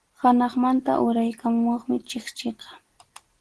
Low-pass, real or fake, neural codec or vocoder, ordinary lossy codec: 10.8 kHz; real; none; Opus, 16 kbps